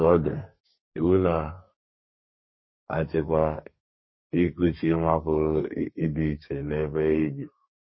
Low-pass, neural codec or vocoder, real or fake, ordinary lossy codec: 7.2 kHz; codec, 32 kHz, 1.9 kbps, SNAC; fake; MP3, 24 kbps